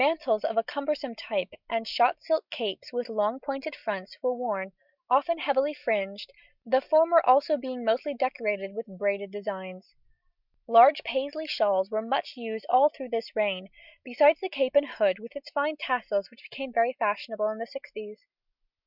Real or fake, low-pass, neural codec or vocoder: real; 5.4 kHz; none